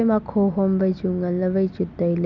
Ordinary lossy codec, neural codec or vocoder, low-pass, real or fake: none; none; 7.2 kHz; real